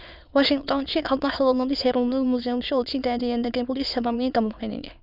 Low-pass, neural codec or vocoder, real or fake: 5.4 kHz; autoencoder, 22.05 kHz, a latent of 192 numbers a frame, VITS, trained on many speakers; fake